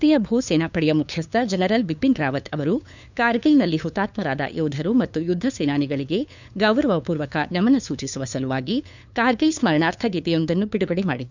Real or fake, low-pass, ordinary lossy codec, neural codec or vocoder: fake; 7.2 kHz; none; codec, 16 kHz, 2 kbps, FunCodec, trained on LibriTTS, 25 frames a second